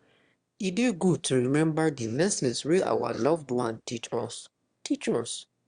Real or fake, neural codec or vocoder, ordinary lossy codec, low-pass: fake; autoencoder, 22.05 kHz, a latent of 192 numbers a frame, VITS, trained on one speaker; Opus, 64 kbps; 9.9 kHz